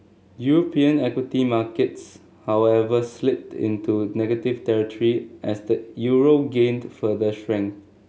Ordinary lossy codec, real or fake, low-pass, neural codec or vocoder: none; real; none; none